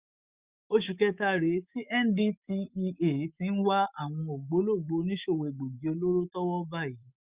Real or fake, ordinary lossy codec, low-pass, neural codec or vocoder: fake; Opus, 64 kbps; 3.6 kHz; autoencoder, 48 kHz, 128 numbers a frame, DAC-VAE, trained on Japanese speech